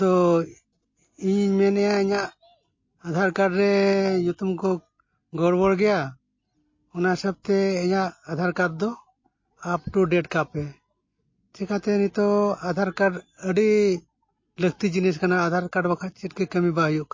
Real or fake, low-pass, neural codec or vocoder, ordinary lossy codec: real; 7.2 kHz; none; MP3, 32 kbps